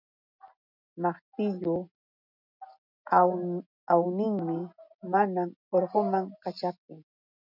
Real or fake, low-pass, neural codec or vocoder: real; 5.4 kHz; none